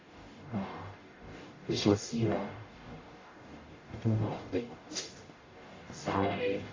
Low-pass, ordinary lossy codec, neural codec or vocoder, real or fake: 7.2 kHz; AAC, 32 kbps; codec, 44.1 kHz, 0.9 kbps, DAC; fake